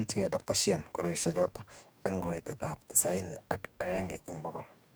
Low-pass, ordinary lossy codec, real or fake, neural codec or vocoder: none; none; fake; codec, 44.1 kHz, 2.6 kbps, DAC